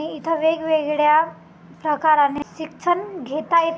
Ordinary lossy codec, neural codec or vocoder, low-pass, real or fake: none; none; none; real